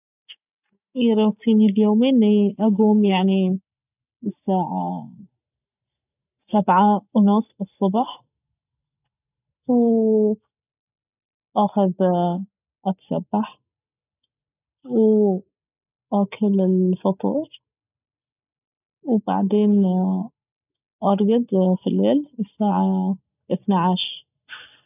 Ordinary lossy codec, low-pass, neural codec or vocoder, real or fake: none; 3.6 kHz; none; real